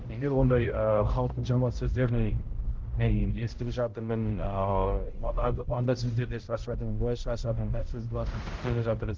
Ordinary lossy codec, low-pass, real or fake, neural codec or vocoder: Opus, 16 kbps; 7.2 kHz; fake; codec, 16 kHz, 0.5 kbps, X-Codec, HuBERT features, trained on balanced general audio